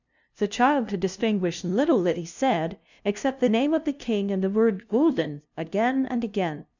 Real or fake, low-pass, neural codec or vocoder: fake; 7.2 kHz; codec, 16 kHz, 0.5 kbps, FunCodec, trained on LibriTTS, 25 frames a second